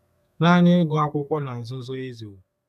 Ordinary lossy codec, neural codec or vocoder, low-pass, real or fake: none; codec, 32 kHz, 1.9 kbps, SNAC; 14.4 kHz; fake